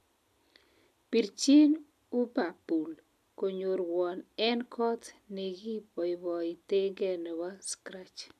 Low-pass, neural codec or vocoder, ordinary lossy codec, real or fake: 14.4 kHz; none; MP3, 96 kbps; real